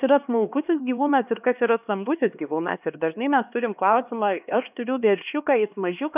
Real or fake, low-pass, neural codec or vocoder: fake; 3.6 kHz; codec, 16 kHz, 2 kbps, X-Codec, HuBERT features, trained on LibriSpeech